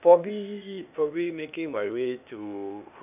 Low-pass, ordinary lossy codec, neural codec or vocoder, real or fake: 3.6 kHz; none; codec, 16 kHz, 0.8 kbps, ZipCodec; fake